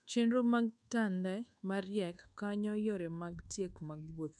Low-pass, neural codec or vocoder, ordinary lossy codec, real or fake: 10.8 kHz; codec, 24 kHz, 1.2 kbps, DualCodec; none; fake